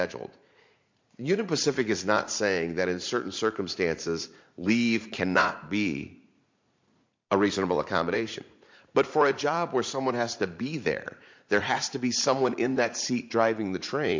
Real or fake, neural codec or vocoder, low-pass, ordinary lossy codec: fake; vocoder, 44.1 kHz, 80 mel bands, Vocos; 7.2 kHz; MP3, 48 kbps